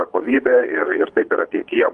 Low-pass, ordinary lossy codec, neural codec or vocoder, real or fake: 9.9 kHz; Opus, 32 kbps; vocoder, 22.05 kHz, 80 mel bands, Vocos; fake